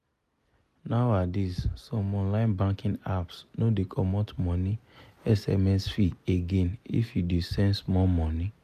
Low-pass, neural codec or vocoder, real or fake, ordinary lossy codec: 14.4 kHz; none; real; none